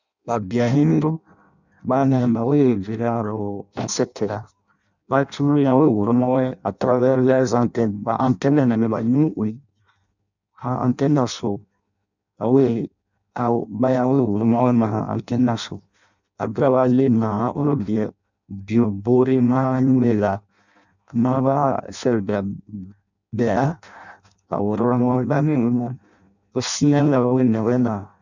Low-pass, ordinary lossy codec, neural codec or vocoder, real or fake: 7.2 kHz; none; codec, 16 kHz in and 24 kHz out, 0.6 kbps, FireRedTTS-2 codec; fake